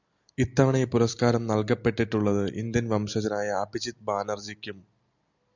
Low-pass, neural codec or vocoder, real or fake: 7.2 kHz; none; real